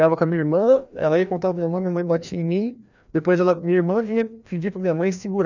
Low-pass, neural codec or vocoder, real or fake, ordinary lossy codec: 7.2 kHz; codec, 16 kHz, 1 kbps, FreqCodec, larger model; fake; none